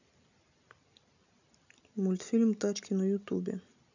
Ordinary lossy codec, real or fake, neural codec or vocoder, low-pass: none; real; none; 7.2 kHz